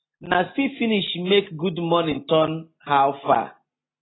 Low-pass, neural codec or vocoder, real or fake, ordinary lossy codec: 7.2 kHz; none; real; AAC, 16 kbps